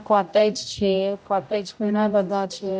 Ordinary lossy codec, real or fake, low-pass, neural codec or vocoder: none; fake; none; codec, 16 kHz, 0.5 kbps, X-Codec, HuBERT features, trained on general audio